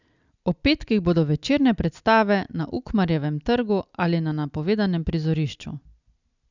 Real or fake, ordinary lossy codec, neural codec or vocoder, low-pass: real; none; none; 7.2 kHz